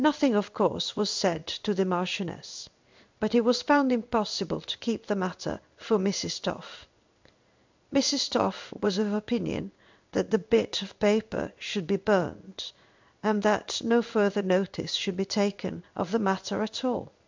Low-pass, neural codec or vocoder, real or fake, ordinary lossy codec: 7.2 kHz; codec, 16 kHz in and 24 kHz out, 1 kbps, XY-Tokenizer; fake; MP3, 64 kbps